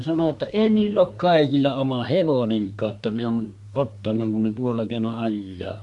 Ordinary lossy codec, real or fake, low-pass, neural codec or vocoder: none; fake; 10.8 kHz; codec, 24 kHz, 1 kbps, SNAC